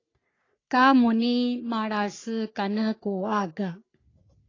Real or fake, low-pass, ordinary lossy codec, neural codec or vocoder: fake; 7.2 kHz; AAC, 32 kbps; codec, 44.1 kHz, 3.4 kbps, Pupu-Codec